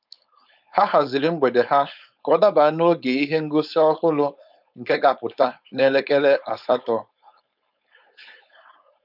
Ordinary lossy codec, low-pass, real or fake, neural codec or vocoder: none; 5.4 kHz; fake; codec, 16 kHz, 4.8 kbps, FACodec